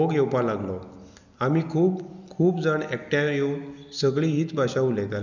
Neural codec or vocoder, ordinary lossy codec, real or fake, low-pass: none; none; real; 7.2 kHz